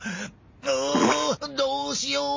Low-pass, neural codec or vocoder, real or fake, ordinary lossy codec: 7.2 kHz; none; real; MP3, 32 kbps